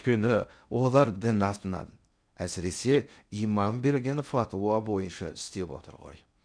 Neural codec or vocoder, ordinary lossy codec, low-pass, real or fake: codec, 16 kHz in and 24 kHz out, 0.6 kbps, FocalCodec, streaming, 2048 codes; none; 9.9 kHz; fake